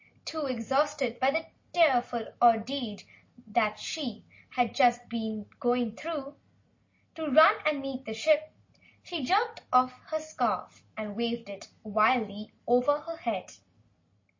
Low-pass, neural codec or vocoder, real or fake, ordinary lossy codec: 7.2 kHz; none; real; MP3, 32 kbps